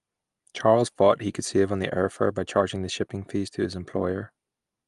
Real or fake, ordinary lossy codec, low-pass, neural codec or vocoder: real; Opus, 32 kbps; 10.8 kHz; none